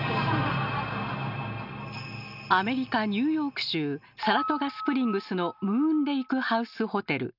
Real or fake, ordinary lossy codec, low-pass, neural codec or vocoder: real; none; 5.4 kHz; none